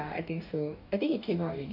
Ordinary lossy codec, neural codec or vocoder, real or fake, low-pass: none; codec, 44.1 kHz, 2.6 kbps, DAC; fake; 5.4 kHz